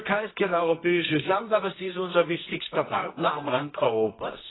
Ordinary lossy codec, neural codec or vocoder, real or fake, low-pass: AAC, 16 kbps; codec, 24 kHz, 0.9 kbps, WavTokenizer, medium music audio release; fake; 7.2 kHz